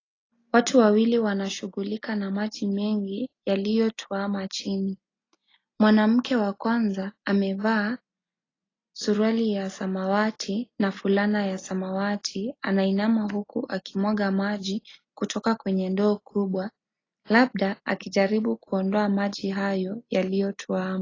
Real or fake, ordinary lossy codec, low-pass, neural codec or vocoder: real; AAC, 32 kbps; 7.2 kHz; none